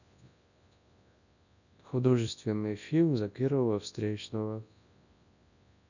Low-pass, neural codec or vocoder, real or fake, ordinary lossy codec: 7.2 kHz; codec, 24 kHz, 0.9 kbps, WavTokenizer, large speech release; fake; none